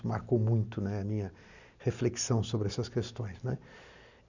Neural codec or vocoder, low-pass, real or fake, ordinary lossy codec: none; 7.2 kHz; real; none